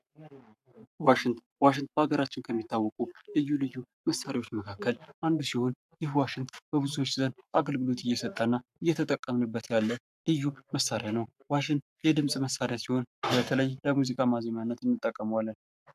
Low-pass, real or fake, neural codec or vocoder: 14.4 kHz; fake; codec, 44.1 kHz, 7.8 kbps, Pupu-Codec